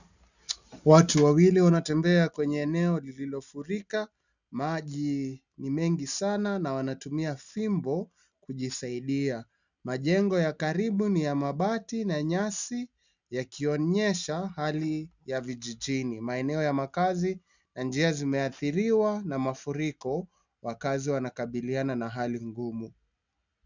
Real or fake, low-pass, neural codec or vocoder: real; 7.2 kHz; none